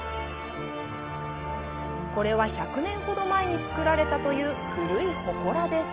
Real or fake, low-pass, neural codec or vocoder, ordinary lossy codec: real; 3.6 kHz; none; Opus, 24 kbps